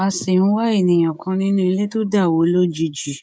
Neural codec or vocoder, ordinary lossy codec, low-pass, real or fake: codec, 16 kHz, 16 kbps, FreqCodec, smaller model; none; none; fake